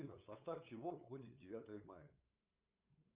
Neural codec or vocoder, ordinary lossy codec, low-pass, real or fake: codec, 16 kHz, 8 kbps, FunCodec, trained on LibriTTS, 25 frames a second; Opus, 64 kbps; 3.6 kHz; fake